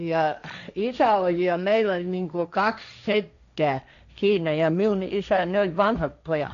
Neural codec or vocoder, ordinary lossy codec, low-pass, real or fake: codec, 16 kHz, 1.1 kbps, Voila-Tokenizer; none; 7.2 kHz; fake